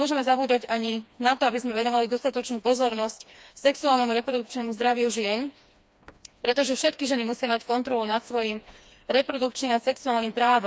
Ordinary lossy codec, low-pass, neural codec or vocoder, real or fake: none; none; codec, 16 kHz, 2 kbps, FreqCodec, smaller model; fake